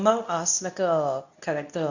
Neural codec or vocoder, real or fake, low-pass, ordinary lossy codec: codec, 24 kHz, 0.9 kbps, WavTokenizer, medium speech release version 2; fake; 7.2 kHz; none